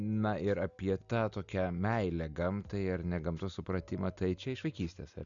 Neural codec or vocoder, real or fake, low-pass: none; real; 7.2 kHz